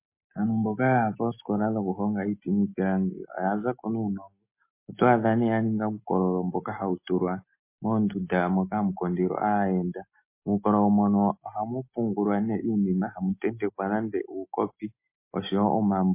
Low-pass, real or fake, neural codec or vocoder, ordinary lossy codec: 3.6 kHz; real; none; MP3, 24 kbps